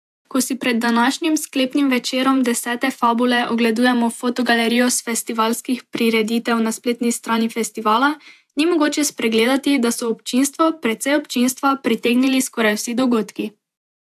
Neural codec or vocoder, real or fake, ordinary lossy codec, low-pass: vocoder, 48 kHz, 128 mel bands, Vocos; fake; AAC, 96 kbps; 14.4 kHz